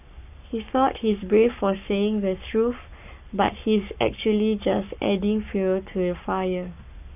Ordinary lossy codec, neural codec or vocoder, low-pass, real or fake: none; codec, 44.1 kHz, 7.8 kbps, Pupu-Codec; 3.6 kHz; fake